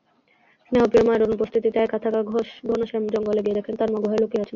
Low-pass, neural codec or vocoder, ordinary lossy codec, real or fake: 7.2 kHz; none; MP3, 64 kbps; real